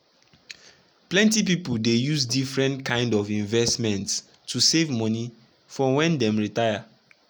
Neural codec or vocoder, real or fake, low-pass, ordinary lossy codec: none; real; none; none